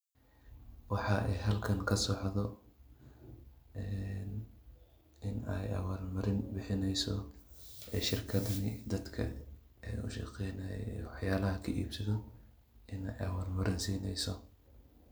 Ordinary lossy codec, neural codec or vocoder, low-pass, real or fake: none; none; none; real